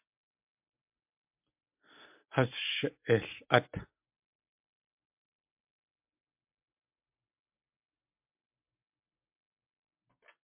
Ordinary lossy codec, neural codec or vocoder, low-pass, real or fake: MP3, 32 kbps; none; 3.6 kHz; real